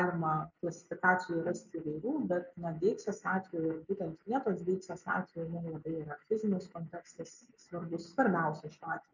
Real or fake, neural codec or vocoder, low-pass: real; none; 7.2 kHz